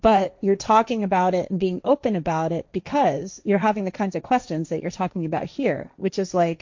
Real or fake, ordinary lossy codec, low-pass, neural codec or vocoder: fake; MP3, 48 kbps; 7.2 kHz; codec, 16 kHz, 1.1 kbps, Voila-Tokenizer